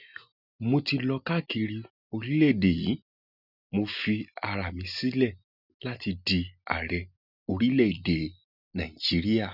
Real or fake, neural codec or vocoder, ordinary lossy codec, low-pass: real; none; none; 5.4 kHz